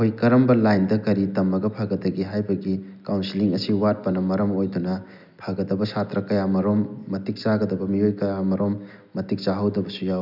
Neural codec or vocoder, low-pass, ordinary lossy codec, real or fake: none; 5.4 kHz; none; real